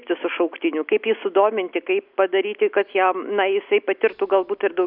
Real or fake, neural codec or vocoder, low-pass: real; none; 5.4 kHz